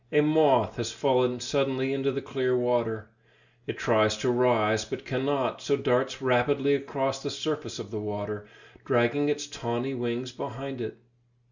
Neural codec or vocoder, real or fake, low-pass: none; real; 7.2 kHz